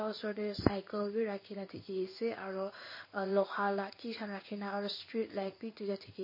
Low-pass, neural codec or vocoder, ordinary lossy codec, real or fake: 5.4 kHz; codec, 16 kHz in and 24 kHz out, 1 kbps, XY-Tokenizer; MP3, 24 kbps; fake